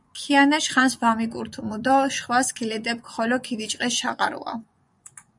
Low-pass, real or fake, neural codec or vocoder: 10.8 kHz; fake; vocoder, 24 kHz, 100 mel bands, Vocos